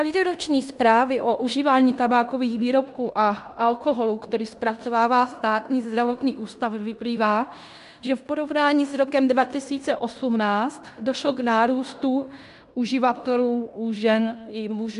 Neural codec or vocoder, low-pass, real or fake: codec, 16 kHz in and 24 kHz out, 0.9 kbps, LongCat-Audio-Codec, fine tuned four codebook decoder; 10.8 kHz; fake